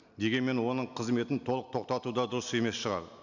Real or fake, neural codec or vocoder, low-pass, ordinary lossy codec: real; none; 7.2 kHz; none